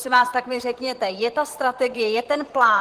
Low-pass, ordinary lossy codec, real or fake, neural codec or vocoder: 14.4 kHz; Opus, 16 kbps; fake; vocoder, 44.1 kHz, 128 mel bands, Pupu-Vocoder